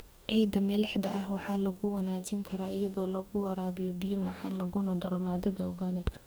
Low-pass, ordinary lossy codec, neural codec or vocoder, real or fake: none; none; codec, 44.1 kHz, 2.6 kbps, DAC; fake